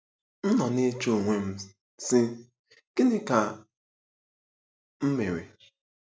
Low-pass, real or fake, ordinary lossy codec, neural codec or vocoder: none; real; none; none